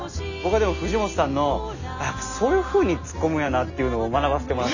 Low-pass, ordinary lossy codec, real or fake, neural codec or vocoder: 7.2 kHz; none; real; none